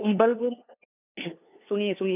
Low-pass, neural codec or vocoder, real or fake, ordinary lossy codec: 3.6 kHz; codec, 16 kHz, 2 kbps, FunCodec, trained on LibriTTS, 25 frames a second; fake; none